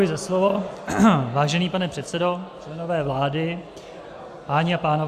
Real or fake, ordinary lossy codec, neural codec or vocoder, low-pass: real; Opus, 64 kbps; none; 14.4 kHz